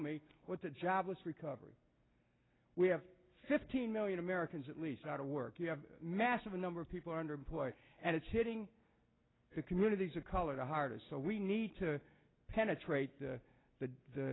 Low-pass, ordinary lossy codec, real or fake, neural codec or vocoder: 7.2 kHz; AAC, 16 kbps; real; none